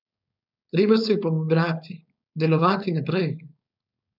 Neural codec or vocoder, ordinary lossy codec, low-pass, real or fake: codec, 16 kHz, 4.8 kbps, FACodec; none; 5.4 kHz; fake